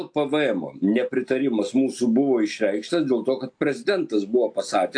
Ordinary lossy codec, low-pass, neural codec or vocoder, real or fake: AAC, 64 kbps; 9.9 kHz; none; real